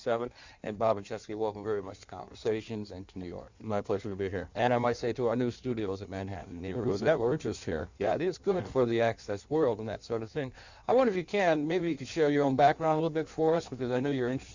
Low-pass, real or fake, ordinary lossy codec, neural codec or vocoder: 7.2 kHz; fake; Opus, 64 kbps; codec, 16 kHz in and 24 kHz out, 1.1 kbps, FireRedTTS-2 codec